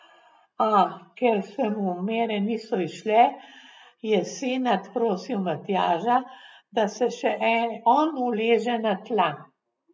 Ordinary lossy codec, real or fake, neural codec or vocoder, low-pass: none; real; none; none